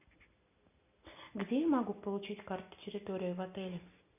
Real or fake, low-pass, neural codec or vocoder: real; 3.6 kHz; none